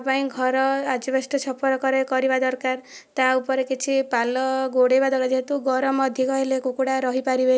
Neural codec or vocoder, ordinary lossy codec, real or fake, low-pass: none; none; real; none